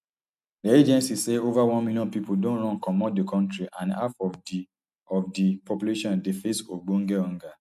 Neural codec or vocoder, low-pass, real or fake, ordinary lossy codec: none; 14.4 kHz; real; MP3, 96 kbps